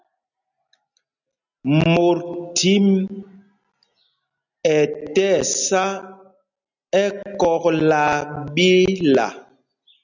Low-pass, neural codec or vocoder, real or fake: 7.2 kHz; none; real